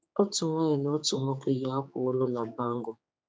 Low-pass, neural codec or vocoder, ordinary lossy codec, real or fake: none; codec, 16 kHz, 4 kbps, X-Codec, HuBERT features, trained on general audio; none; fake